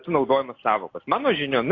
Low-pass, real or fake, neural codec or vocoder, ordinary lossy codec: 7.2 kHz; real; none; AAC, 32 kbps